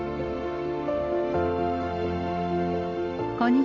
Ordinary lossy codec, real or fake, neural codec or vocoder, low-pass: none; real; none; 7.2 kHz